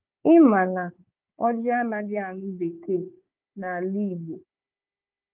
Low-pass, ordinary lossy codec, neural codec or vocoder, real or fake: 3.6 kHz; Opus, 24 kbps; codec, 16 kHz, 4 kbps, FunCodec, trained on Chinese and English, 50 frames a second; fake